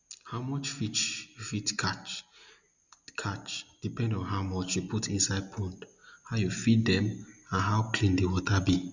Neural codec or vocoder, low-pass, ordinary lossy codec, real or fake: none; 7.2 kHz; none; real